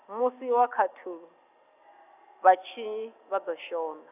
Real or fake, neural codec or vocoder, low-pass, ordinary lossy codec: real; none; 3.6 kHz; none